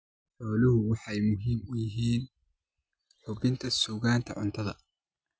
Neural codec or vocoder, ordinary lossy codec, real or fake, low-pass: none; none; real; none